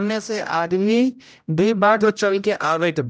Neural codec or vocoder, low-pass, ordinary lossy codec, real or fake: codec, 16 kHz, 0.5 kbps, X-Codec, HuBERT features, trained on general audio; none; none; fake